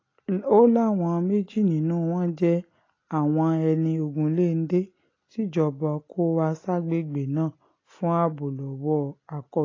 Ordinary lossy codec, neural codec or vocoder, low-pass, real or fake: AAC, 32 kbps; none; 7.2 kHz; real